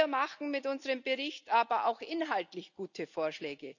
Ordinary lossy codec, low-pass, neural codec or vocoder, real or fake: none; 7.2 kHz; none; real